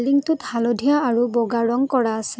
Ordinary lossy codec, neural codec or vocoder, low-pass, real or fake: none; none; none; real